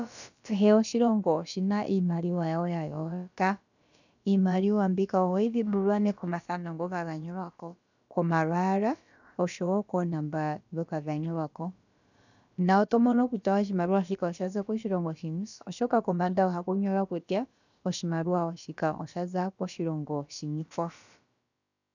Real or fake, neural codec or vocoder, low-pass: fake; codec, 16 kHz, about 1 kbps, DyCAST, with the encoder's durations; 7.2 kHz